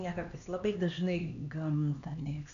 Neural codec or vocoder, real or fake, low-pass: codec, 16 kHz, 2 kbps, X-Codec, HuBERT features, trained on LibriSpeech; fake; 7.2 kHz